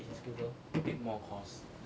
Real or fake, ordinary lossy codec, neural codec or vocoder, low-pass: real; none; none; none